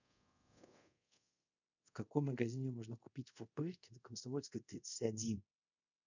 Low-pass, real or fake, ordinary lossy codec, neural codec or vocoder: 7.2 kHz; fake; none; codec, 24 kHz, 0.5 kbps, DualCodec